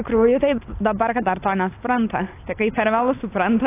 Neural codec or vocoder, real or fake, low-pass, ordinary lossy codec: none; real; 3.6 kHz; AAC, 24 kbps